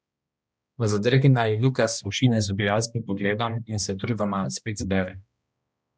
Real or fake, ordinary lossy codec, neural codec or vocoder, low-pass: fake; none; codec, 16 kHz, 1 kbps, X-Codec, HuBERT features, trained on balanced general audio; none